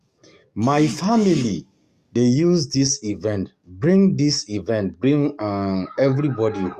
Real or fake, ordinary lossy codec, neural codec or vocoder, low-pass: fake; Opus, 64 kbps; codec, 44.1 kHz, 7.8 kbps, DAC; 14.4 kHz